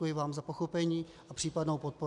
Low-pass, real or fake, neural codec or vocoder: 10.8 kHz; real; none